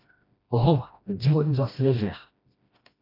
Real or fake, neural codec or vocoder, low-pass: fake; codec, 16 kHz, 1 kbps, FreqCodec, smaller model; 5.4 kHz